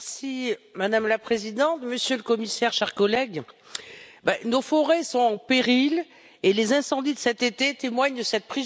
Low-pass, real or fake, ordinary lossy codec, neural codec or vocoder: none; real; none; none